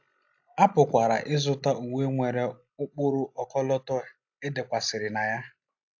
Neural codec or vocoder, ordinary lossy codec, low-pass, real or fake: none; AAC, 48 kbps; 7.2 kHz; real